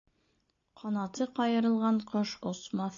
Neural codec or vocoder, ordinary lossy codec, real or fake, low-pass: none; AAC, 64 kbps; real; 7.2 kHz